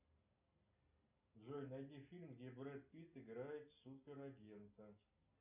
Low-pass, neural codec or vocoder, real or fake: 3.6 kHz; none; real